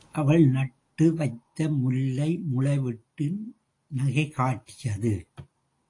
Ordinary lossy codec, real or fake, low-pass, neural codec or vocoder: AAC, 48 kbps; real; 10.8 kHz; none